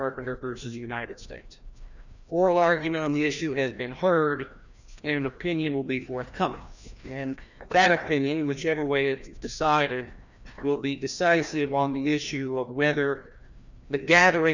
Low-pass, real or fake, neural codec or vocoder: 7.2 kHz; fake; codec, 16 kHz, 1 kbps, FreqCodec, larger model